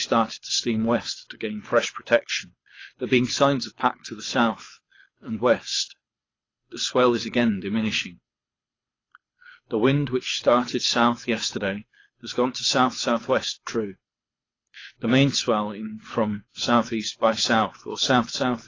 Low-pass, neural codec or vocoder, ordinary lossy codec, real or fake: 7.2 kHz; codec, 24 kHz, 6 kbps, HILCodec; AAC, 32 kbps; fake